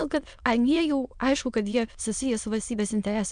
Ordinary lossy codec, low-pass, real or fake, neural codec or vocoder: MP3, 96 kbps; 9.9 kHz; fake; autoencoder, 22.05 kHz, a latent of 192 numbers a frame, VITS, trained on many speakers